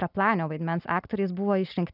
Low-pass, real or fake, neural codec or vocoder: 5.4 kHz; real; none